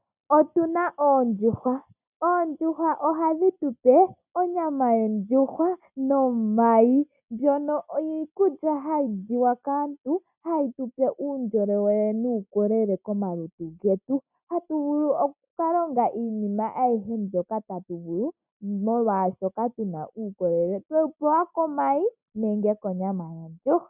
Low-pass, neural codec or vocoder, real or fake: 3.6 kHz; none; real